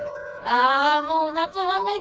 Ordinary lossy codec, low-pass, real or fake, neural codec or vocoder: none; none; fake; codec, 16 kHz, 2 kbps, FreqCodec, smaller model